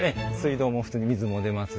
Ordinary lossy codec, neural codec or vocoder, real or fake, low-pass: none; none; real; none